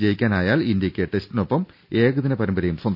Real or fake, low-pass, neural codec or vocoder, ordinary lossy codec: real; 5.4 kHz; none; none